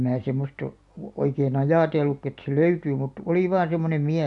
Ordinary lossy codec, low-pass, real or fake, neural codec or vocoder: none; 10.8 kHz; real; none